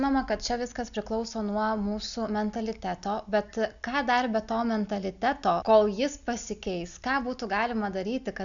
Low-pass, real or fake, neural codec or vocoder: 7.2 kHz; real; none